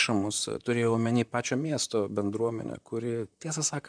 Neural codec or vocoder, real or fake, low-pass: vocoder, 44.1 kHz, 128 mel bands, Pupu-Vocoder; fake; 9.9 kHz